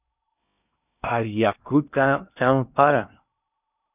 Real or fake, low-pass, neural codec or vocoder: fake; 3.6 kHz; codec, 16 kHz in and 24 kHz out, 0.6 kbps, FocalCodec, streaming, 2048 codes